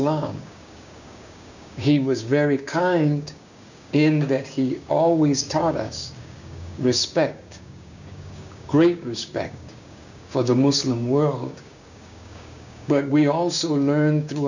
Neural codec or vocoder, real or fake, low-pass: codec, 16 kHz, 6 kbps, DAC; fake; 7.2 kHz